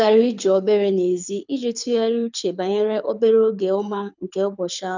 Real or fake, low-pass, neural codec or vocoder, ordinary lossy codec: fake; 7.2 kHz; codec, 24 kHz, 6 kbps, HILCodec; none